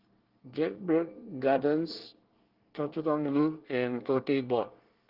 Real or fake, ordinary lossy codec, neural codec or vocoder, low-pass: fake; Opus, 16 kbps; codec, 24 kHz, 1 kbps, SNAC; 5.4 kHz